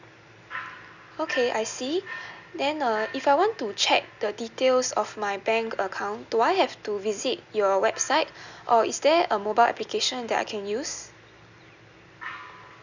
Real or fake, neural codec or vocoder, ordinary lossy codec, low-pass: real; none; none; 7.2 kHz